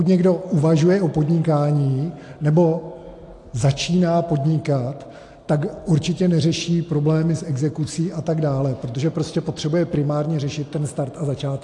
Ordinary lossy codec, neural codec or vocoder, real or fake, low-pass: AAC, 48 kbps; none; real; 10.8 kHz